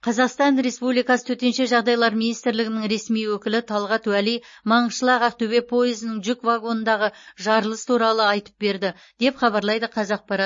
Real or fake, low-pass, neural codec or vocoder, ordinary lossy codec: real; 7.2 kHz; none; MP3, 32 kbps